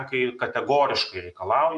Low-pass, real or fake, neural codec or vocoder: 10.8 kHz; real; none